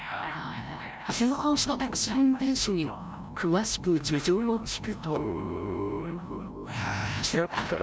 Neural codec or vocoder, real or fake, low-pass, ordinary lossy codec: codec, 16 kHz, 0.5 kbps, FreqCodec, larger model; fake; none; none